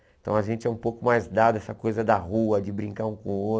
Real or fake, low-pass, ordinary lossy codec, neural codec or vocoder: real; none; none; none